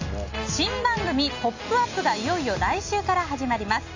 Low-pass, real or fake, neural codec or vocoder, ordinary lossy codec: 7.2 kHz; real; none; AAC, 48 kbps